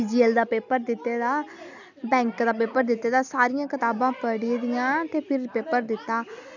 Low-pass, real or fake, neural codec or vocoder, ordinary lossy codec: 7.2 kHz; real; none; none